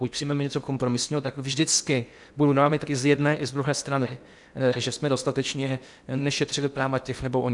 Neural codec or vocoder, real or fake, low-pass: codec, 16 kHz in and 24 kHz out, 0.8 kbps, FocalCodec, streaming, 65536 codes; fake; 10.8 kHz